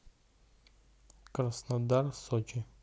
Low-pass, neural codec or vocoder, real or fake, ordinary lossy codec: none; none; real; none